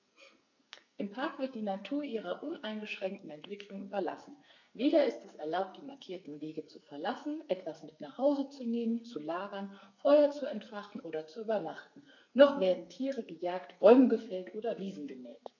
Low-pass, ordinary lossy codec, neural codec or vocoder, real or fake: 7.2 kHz; none; codec, 44.1 kHz, 2.6 kbps, SNAC; fake